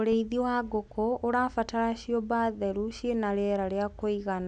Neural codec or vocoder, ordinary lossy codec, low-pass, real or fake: none; none; 10.8 kHz; real